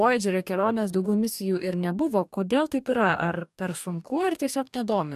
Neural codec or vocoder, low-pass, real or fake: codec, 44.1 kHz, 2.6 kbps, DAC; 14.4 kHz; fake